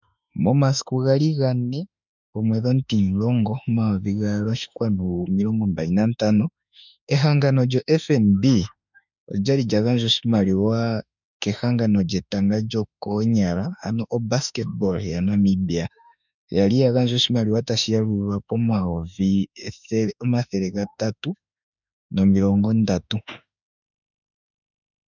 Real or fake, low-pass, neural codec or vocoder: fake; 7.2 kHz; autoencoder, 48 kHz, 32 numbers a frame, DAC-VAE, trained on Japanese speech